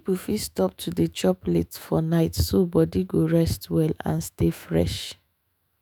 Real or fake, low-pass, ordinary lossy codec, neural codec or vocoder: fake; none; none; autoencoder, 48 kHz, 128 numbers a frame, DAC-VAE, trained on Japanese speech